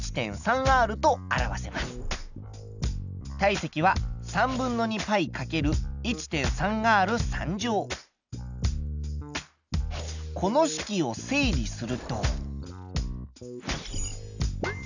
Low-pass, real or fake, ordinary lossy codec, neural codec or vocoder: 7.2 kHz; real; none; none